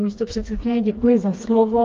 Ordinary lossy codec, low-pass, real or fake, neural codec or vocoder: Opus, 32 kbps; 7.2 kHz; fake; codec, 16 kHz, 2 kbps, FreqCodec, smaller model